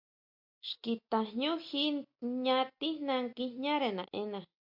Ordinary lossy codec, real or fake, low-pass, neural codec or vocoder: MP3, 48 kbps; real; 5.4 kHz; none